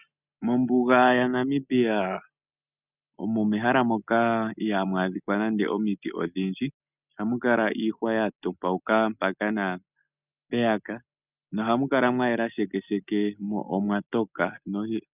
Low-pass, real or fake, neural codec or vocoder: 3.6 kHz; real; none